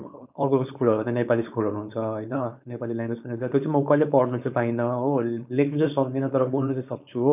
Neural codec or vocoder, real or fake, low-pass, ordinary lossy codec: codec, 16 kHz, 4.8 kbps, FACodec; fake; 3.6 kHz; none